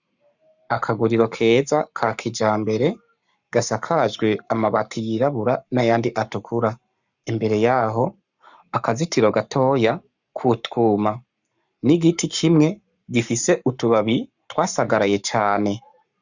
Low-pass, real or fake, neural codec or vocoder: 7.2 kHz; fake; codec, 44.1 kHz, 7.8 kbps, Pupu-Codec